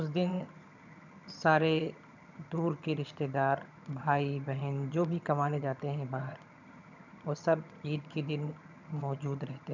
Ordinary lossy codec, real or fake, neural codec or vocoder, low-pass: none; fake; vocoder, 22.05 kHz, 80 mel bands, HiFi-GAN; 7.2 kHz